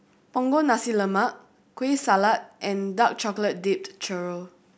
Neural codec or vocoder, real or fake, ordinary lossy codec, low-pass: none; real; none; none